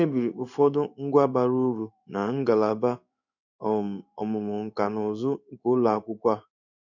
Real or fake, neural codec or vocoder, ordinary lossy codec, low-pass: fake; codec, 16 kHz in and 24 kHz out, 1 kbps, XY-Tokenizer; none; 7.2 kHz